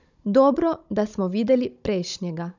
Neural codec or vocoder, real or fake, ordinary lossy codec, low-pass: codec, 16 kHz, 16 kbps, FunCodec, trained on Chinese and English, 50 frames a second; fake; none; 7.2 kHz